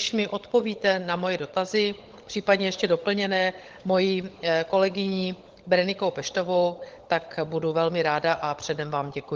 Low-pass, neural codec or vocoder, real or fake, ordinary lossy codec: 7.2 kHz; codec, 16 kHz, 16 kbps, FunCodec, trained on LibriTTS, 50 frames a second; fake; Opus, 16 kbps